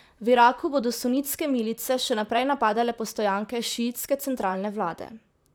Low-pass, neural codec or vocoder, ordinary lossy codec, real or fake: none; vocoder, 44.1 kHz, 128 mel bands, Pupu-Vocoder; none; fake